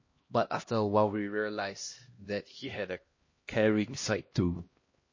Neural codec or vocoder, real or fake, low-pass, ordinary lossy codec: codec, 16 kHz, 1 kbps, X-Codec, HuBERT features, trained on LibriSpeech; fake; 7.2 kHz; MP3, 32 kbps